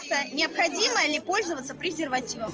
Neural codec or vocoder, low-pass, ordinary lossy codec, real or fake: none; 7.2 kHz; Opus, 24 kbps; real